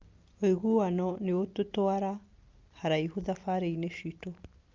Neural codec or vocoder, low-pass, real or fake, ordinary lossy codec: none; 7.2 kHz; real; Opus, 24 kbps